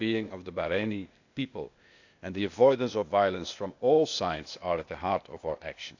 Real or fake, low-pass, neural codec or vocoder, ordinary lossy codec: fake; 7.2 kHz; codec, 16 kHz, 0.8 kbps, ZipCodec; none